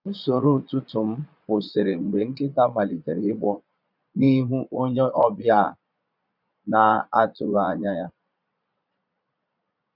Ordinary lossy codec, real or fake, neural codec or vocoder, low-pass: none; fake; vocoder, 22.05 kHz, 80 mel bands, Vocos; 5.4 kHz